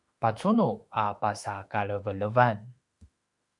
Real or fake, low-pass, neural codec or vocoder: fake; 10.8 kHz; autoencoder, 48 kHz, 32 numbers a frame, DAC-VAE, trained on Japanese speech